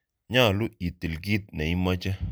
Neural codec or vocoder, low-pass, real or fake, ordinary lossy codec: none; none; real; none